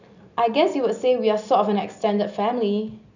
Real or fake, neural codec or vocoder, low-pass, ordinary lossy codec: real; none; 7.2 kHz; none